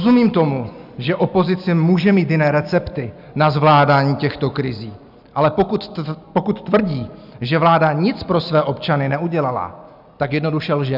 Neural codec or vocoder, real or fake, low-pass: none; real; 5.4 kHz